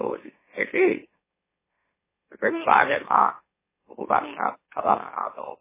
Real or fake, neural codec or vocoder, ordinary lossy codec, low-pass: fake; autoencoder, 44.1 kHz, a latent of 192 numbers a frame, MeloTTS; MP3, 16 kbps; 3.6 kHz